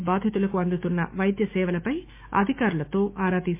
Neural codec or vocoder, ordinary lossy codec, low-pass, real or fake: autoencoder, 48 kHz, 128 numbers a frame, DAC-VAE, trained on Japanese speech; MP3, 24 kbps; 3.6 kHz; fake